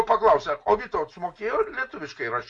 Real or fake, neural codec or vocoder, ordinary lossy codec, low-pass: real; none; AAC, 64 kbps; 10.8 kHz